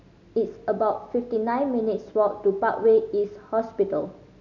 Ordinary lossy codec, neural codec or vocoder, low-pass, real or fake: none; none; 7.2 kHz; real